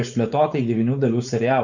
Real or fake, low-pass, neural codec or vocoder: fake; 7.2 kHz; codec, 16 kHz, 4.8 kbps, FACodec